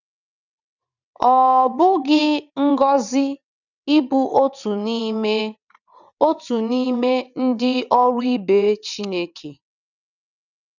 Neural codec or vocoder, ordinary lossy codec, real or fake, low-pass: vocoder, 22.05 kHz, 80 mel bands, WaveNeXt; none; fake; 7.2 kHz